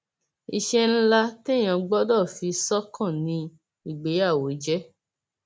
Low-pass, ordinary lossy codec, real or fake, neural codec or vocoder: none; none; real; none